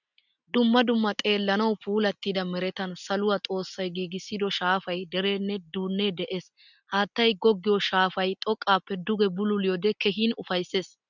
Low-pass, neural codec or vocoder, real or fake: 7.2 kHz; none; real